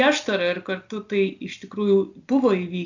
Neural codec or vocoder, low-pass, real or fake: none; 7.2 kHz; real